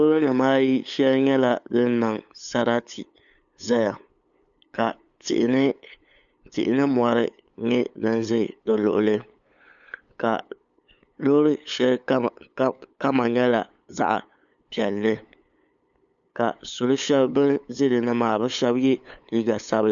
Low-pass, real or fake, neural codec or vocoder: 7.2 kHz; fake; codec, 16 kHz, 8 kbps, FunCodec, trained on LibriTTS, 25 frames a second